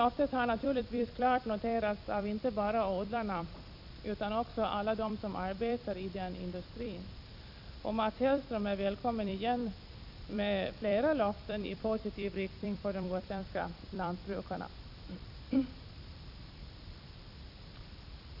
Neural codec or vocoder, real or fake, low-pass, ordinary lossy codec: vocoder, 44.1 kHz, 128 mel bands every 256 samples, BigVGAN v2; fake; 5.4 kHz; none